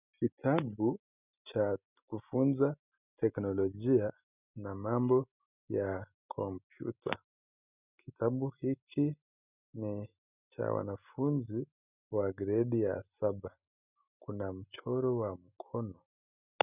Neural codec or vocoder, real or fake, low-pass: none; real; 3.6 kHz